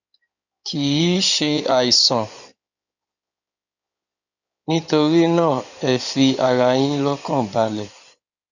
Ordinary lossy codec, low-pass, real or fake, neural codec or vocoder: none; 7.2 kHz; fake; codec, 16 kHz in and 24 kHz out, 2.2 kbps, FireRedTTS-2 codec